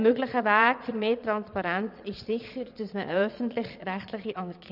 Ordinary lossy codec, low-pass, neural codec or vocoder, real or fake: none; 5.4 kHz; vocoder, 22.05 kHz, 80 mel bands, Vocos; fake